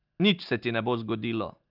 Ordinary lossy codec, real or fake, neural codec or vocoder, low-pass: Opus, 24 kbps; real; none; 5.4 kHz